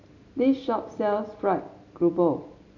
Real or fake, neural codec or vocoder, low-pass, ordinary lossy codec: real; none; 7.2 kHz; none